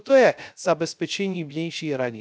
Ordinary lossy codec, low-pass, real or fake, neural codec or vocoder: none; none; fake; codec, 16 kHz, 0.3 kbps, FocalCodec